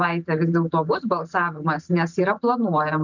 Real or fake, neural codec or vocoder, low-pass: real; none; 7.2 kHz